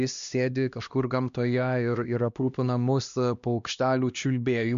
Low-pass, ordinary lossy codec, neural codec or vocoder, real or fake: 7.2 kHz; MP3, 64 kbps; codec, 16 kHz, 1 kbps, X-Codec, HuBERT features, trained on LibriSpeech; fake